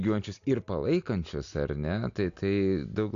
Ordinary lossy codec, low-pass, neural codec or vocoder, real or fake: AAC, 96 kbps; 7.2 kHz; none; real